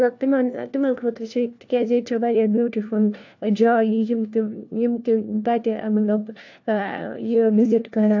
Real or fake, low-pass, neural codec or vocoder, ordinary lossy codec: fake; 7.2 kHz; codec, 16 kHz, 1 kbps, FunCodec, trained on LibriTTS, 50 frames a second; AAC, 48 kbps